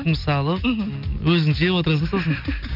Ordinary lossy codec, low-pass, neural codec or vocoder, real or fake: AAC, 48 kbps; 5.4 kHz; none; real